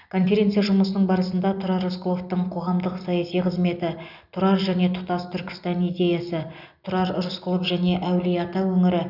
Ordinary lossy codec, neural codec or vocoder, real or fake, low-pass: none; none; real; 5.4 kHz